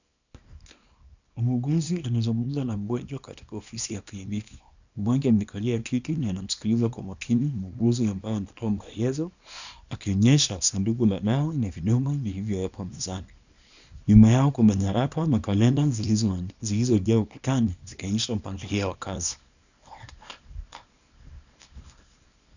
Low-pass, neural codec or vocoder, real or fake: 7.2 kHz; codec, 24 kHz, 0.9 kbps, WavTokenizer, small release; fake